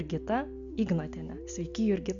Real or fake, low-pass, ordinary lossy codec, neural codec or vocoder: real; 7.2 kHz; MP3, 64 kbps; none